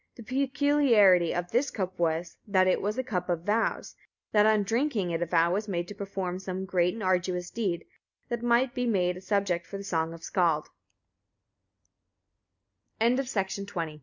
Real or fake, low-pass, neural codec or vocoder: real; 7.2 kHz; none